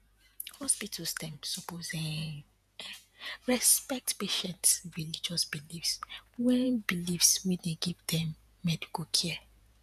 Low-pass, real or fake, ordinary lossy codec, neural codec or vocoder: 14.4 kHz; fake; none; vocoder, 48 kHz, 128 mel bands, Vocos